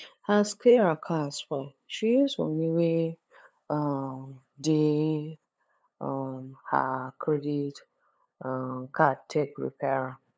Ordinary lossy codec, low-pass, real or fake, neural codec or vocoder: none; none; fake; codec, 16 kHz, 8 kbps, FunCodec, trained on LibriTTS, 25 frames a second